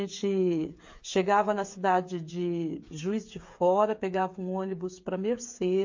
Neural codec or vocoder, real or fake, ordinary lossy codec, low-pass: codec, 16 kHz, 8 kbps, FreqCodec, smaller model; fake; MP3, 48 kbps; 7.2 kHz